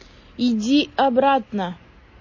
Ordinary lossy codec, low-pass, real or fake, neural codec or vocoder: MP3, 32 kbps; 7.2 kHz; real; none